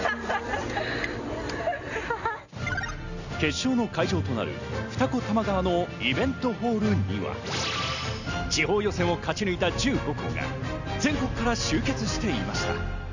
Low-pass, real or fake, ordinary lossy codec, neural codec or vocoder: 7.2 kHz; real; none; none